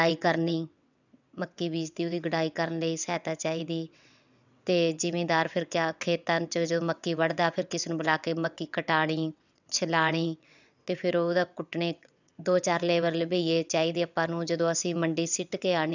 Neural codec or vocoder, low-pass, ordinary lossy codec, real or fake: vocoder, 22.05 kHz, 80 mel bands, WaveNeXt; 7.2 kHz; none; fake